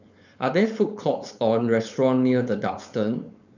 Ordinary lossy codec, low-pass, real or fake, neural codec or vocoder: none; 7.2 kHz; fake; codec, 16 kHz, 4.8 kbps, FACodec